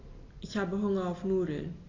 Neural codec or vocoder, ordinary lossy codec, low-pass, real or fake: none; AAC, 32 kbps; 7.2 kHz; real